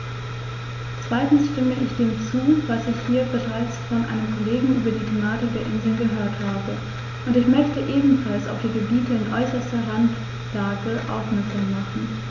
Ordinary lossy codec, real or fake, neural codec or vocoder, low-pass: none; real; none; 7.2 kHz